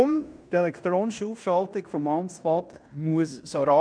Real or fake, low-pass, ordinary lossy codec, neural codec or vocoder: fake; 9.9 kHz; none; codec, 16 kHz in and 24 kHz out, 0.9 kbps, LongCat-Audio-Codec, fine tuned four codebook decoder